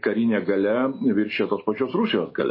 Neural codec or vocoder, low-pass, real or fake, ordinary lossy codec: none; 5.4 kHz; real; MP3, 24 kbps